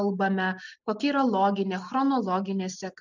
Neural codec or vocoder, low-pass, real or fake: none; 7.2 kHz; real